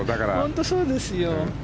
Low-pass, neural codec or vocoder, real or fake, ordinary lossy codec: none; none; real; none